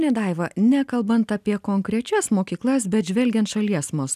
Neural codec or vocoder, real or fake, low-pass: none; real; 14.4 kHz